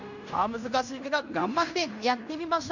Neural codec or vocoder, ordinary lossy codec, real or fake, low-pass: codec, 16 kHz in and 24 kHz out, 0.9 kbps, LongCat-Audio-Codec, fine tuned four codebook decoder; none; fake; 7.2 kHz